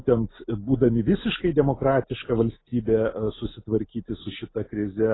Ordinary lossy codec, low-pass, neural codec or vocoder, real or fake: AAC, 16 kbps; 7.2 kHz; none; real